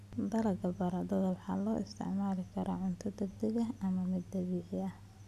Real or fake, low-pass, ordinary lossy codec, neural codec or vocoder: real; 14.4 kHz; none; none